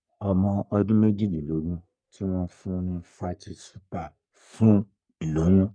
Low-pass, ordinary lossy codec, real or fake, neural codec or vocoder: 9.9 kHz; none; fake; codec, 44.1 kHz, 3.4 kbps, Pupu-Codec